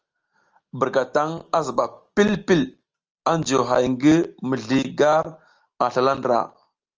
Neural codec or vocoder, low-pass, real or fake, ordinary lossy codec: none; 7.2 kHz; real; Opus, 24 kbps